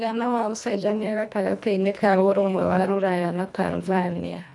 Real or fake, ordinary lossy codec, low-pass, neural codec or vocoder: fake; none; none; codec, 24 kHz, 1.5 kbps, HILCodec